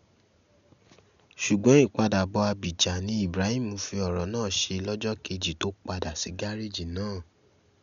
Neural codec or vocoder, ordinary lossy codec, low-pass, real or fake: none; none; 7.2 kHz; real